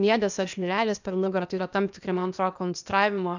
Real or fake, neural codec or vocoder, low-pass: fake; codec, 16 kHz, 0.8 kbps, ZipCodec; 7.2 kHz